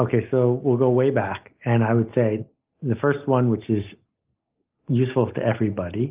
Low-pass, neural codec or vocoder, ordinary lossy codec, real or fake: 3.6 kHz; none; Opus, 24 kbps; real